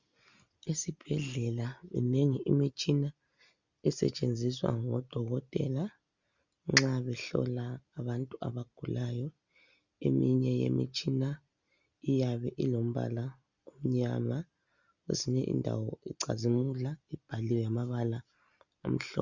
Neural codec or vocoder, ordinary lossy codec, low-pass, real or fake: none; Opus, 64 kbps; 7.2 kHz; real